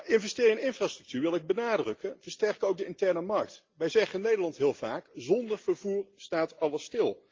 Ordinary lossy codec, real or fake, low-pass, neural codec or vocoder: Opus, 32 kbps; real; 7.2 kHz; none